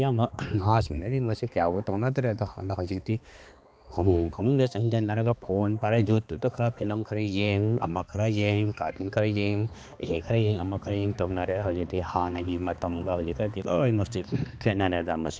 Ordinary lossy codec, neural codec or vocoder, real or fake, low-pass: none; codec, 16 kHz, 2 kbps, X-Codec, HuBERT features, trained on balanced general audio; fake; none